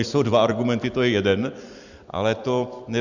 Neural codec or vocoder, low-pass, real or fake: vocoder, 44.1 kHz, 128 mel bands every 256 samples, BigVGAN v2; 7.2 kHz; fake